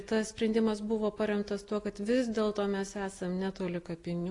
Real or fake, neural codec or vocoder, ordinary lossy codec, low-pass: real; none; AAC, 48 kbps; 10.8 kHz